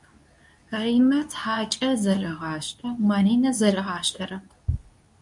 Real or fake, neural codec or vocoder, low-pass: fake; codec, 24 kHz, 0.9 kbps, WavTokenizer, medium speech release version 2; 10.8 kHz